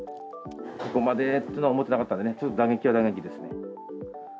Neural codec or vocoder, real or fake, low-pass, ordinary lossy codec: none; real; none; none